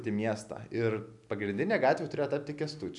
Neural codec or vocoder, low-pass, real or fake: none; 10.8 kHz; real